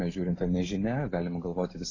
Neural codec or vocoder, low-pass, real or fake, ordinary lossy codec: none; 7.2 kHz; real; AAC, 32 kbps